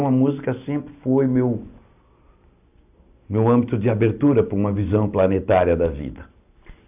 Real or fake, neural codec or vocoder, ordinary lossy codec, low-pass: real; none; AAC, 32 kbps; 3.6 kHz